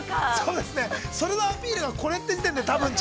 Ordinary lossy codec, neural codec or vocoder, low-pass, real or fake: none; none; none; real